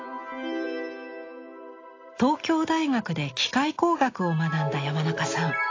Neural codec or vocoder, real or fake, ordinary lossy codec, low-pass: none; real; AAC, 32 kbps; 7.2 kHz